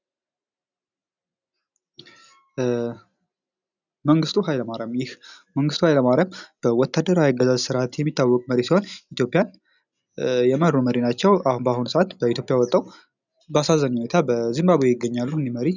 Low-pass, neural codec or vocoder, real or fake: 7.2 kHz; none; real